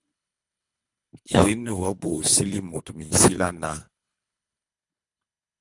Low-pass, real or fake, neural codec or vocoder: 10.8 kHz; fake; codec, 24 kHz, 3 kbps, HILCodec